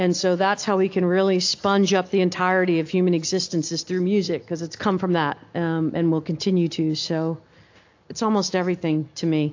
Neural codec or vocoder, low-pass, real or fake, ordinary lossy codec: none; 7.2 kHz; real; AAC, 48 kbps